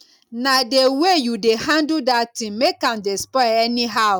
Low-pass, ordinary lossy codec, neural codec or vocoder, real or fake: 19.8 kHz; none; none; real